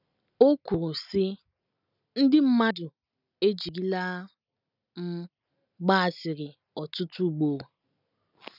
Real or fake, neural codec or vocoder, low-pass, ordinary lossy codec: real; none; 5.4 kHz; none